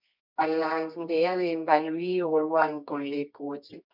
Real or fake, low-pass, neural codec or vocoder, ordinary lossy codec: fake; 5.4 kHz; codec, 24 kHz, 0.9 kbps, WavTokenizer, medium music audio release; MP3, 48 kbps